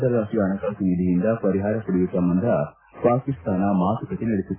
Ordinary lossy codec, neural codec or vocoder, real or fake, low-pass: AAC, 16 kbps; none; real; 3.6 kHz